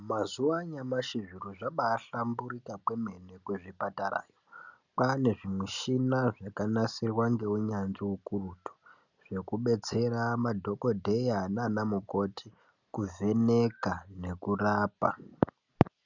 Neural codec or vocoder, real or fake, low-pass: none; real; 7.2 kHz